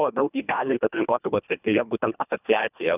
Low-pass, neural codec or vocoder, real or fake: 3.6 kHz; codec, 24 kHz, 1.5 kbps, HILCodec; fake